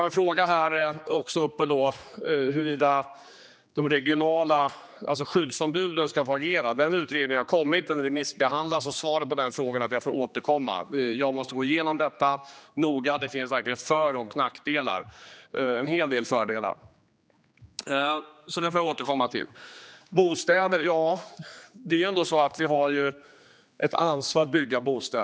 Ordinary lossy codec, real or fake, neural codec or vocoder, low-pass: none; fake; codec, 16 kHz, 2 kbps, X-Codec, HuBERT features, trained on general audio; none